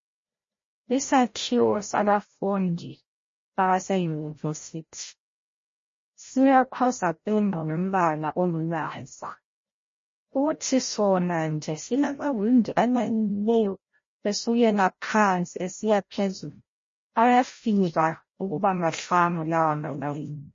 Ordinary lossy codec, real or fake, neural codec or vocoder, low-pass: MP3, 32 kbps; fake; codec, 16 kHz, 0.5 kbps, FreqCodec, larger model; 7.2 kHz